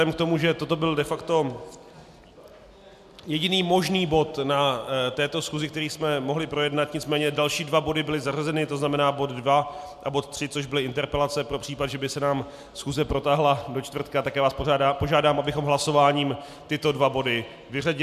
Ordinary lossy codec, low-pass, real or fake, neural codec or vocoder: MP3, 96 kbps; 14.4 kHz; real; none